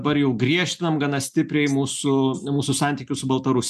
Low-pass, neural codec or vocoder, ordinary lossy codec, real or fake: 14.4 kHz; none; MP3, 96 kbps; real